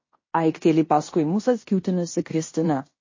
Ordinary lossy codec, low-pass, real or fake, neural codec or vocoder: MP3, 32 kbps; 7.2 kHz; fake; codec, 16 kHz in and 24 kHz out, 0.9 kbps, LongCat-Audio-Codec, fine tuned four codebook decoder